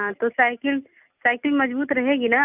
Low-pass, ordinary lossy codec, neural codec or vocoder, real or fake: 3.6 kHz; none; none; real